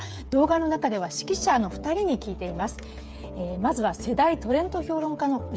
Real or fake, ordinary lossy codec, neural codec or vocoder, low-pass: fake; none; codec, 16 kHz, 8 kbps, FreqCodec, smaller model; none